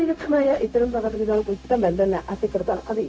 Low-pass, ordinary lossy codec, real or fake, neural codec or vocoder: none; none; fake; codec, 16 kHz, 0.4 kbps, LongCat-Audio-Codec